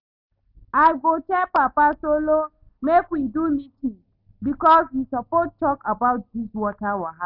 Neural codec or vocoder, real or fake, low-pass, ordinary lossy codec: none; real; 5.4 kHz; none